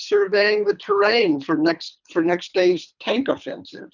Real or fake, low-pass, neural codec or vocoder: fake; 7.2 kHz; codec, 24 kHz, 3 kbps, HILCodec